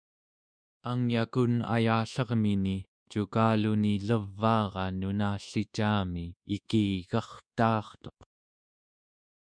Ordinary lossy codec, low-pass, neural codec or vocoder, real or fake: MP3, 96 kbps; 9.9 kHz; codec, 24 kHz, 1.2 kbps, DualCodec; fake